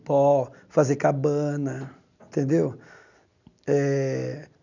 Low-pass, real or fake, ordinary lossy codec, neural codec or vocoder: 7.2 kHz; real; none; none